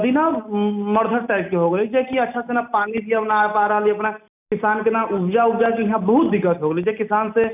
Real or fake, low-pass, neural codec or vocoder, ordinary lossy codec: real; 3.6 kHz; none; none